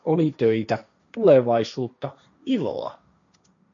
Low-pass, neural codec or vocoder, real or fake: 7.2 kHz; codec, 16 kHz, 1.1 kbps, Voila-Tokenizer; fake